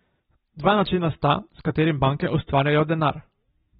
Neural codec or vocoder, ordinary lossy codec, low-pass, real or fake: none; AAC, 16 kbps; 7.2 kHz; real